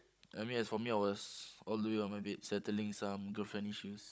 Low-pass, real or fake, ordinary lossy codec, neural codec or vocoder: none; fake; none; codec, 16 kHz, 16 kbps, FunCodec, trained on Chinese and English, 50 frames a second